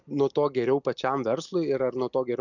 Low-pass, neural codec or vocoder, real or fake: 7.2 kHz; none; real